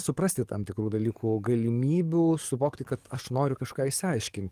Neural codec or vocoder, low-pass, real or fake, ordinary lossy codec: codec, 44.1 kHz, 7.8 kbps, DAC; 14.4 kHz; fake; Opus, 24 kbps